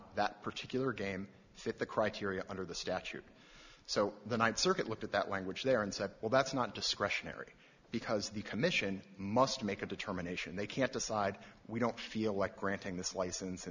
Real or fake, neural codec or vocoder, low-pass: real; none; 7.2 kHz